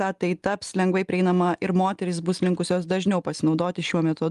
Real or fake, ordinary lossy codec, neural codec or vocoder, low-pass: real; Opus, 32 kbps; none; 10.8 kHz